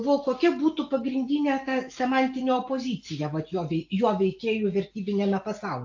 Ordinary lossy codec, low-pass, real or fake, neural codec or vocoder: AAC, 48 kbps; 7.2 kHz; real; none